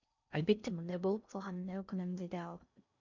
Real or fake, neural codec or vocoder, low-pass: fake; codec, 16 kHz in and 24 kHz out, 0.6 kbps, FocalCodec, streaming, 4096 codes; 7.2 kHz